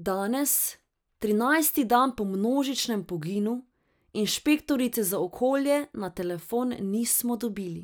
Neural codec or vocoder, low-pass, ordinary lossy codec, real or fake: none; none; none; real